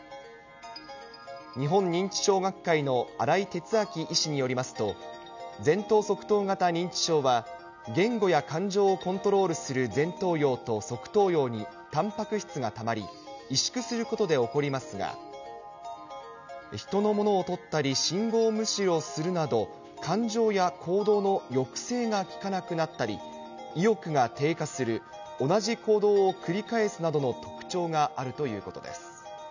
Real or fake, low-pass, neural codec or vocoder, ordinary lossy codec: real; 7.2 kHz; none; none